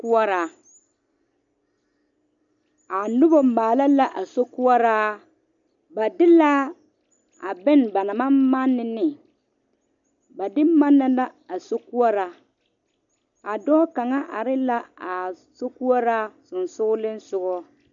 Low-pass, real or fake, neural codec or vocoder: 7.2 kHz; real; none